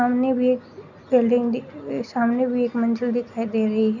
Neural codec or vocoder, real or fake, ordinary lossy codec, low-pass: none; real; none; 7.2 kHz